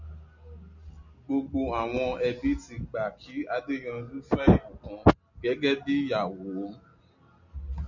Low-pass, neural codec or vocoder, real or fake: 7.2 kHz; none; real